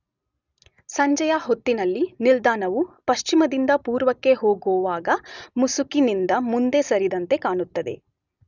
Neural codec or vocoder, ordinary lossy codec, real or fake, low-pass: none; none; real; 7.2 kHz